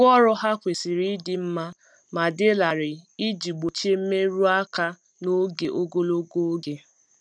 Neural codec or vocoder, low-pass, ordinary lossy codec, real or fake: none; none; none; real